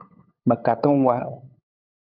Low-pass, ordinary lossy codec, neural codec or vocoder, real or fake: 5.4 kHz; AAC, 32 kbps; codec, 16 kHz, 8 kbps, FunCodec, trained on LibriTTS, 25 frames a second; fake